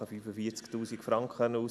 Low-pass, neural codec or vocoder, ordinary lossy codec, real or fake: none; none; none; real